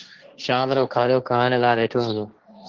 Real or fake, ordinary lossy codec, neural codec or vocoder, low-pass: fake; Opus, 16 kbps; codec, 16 kHz, 1.1 kbps, Voila-Tokenizer; 7.2 kHz